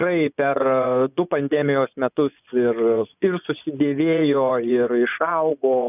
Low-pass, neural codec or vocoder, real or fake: 3.6 kHz; vocoder, 24 kHz, 100 mel bands, Vocos; fake